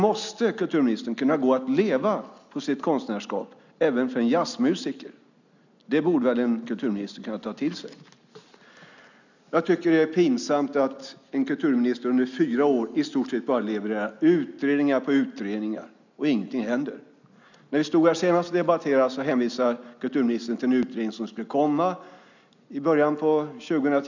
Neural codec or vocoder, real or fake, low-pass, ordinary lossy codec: none; real; 7.2 kHz; none